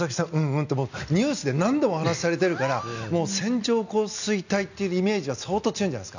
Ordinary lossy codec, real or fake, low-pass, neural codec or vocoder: none; real; 7.2 kHz; none